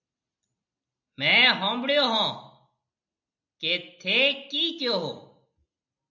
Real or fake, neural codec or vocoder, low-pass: real; none; 7.2 kHz